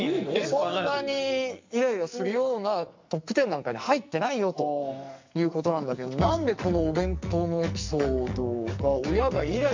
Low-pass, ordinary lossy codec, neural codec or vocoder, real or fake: 7.2 kHz; MP3, 48 kbps; codec, 44.1 kHz, 2.6 kbps, SNAC; fake